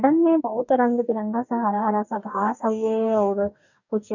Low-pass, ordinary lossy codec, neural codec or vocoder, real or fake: 7.2 kHz; none; codec, 44.1 kHz, 2.6 kbps, DAC; fake